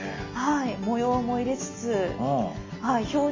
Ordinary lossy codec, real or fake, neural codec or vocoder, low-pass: MP3, 32 kbps; real; none; 7.2 kHz